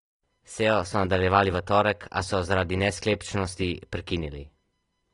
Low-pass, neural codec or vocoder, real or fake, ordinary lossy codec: 10.8 kHz; none; real; AAC, 32 kbps